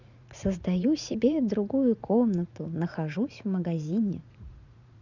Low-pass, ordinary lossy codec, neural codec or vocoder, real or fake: 7.2 kHz; none; vocoder, 44.1 kHz, 80 mel bands, Vocos; fake